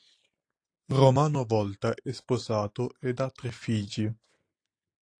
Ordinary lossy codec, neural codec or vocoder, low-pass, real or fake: AAC, 48 kbps; none; 9.9 kHz; real